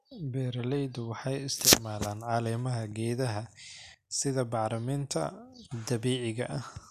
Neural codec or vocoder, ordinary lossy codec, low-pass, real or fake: none; none; 14.4 kHz; real